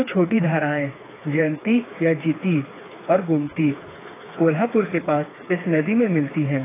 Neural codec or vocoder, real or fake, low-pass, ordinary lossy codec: codec, 16 kHz, 4 kbps, FreqCodec, smaller model; fake; 3.6 kHz; AAC, 16 kbps